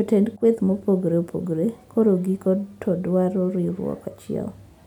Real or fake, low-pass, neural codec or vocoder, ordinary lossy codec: real; 19.8 kHz; none; none